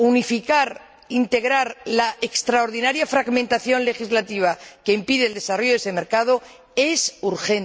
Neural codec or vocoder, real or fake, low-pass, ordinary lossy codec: none; real; none; none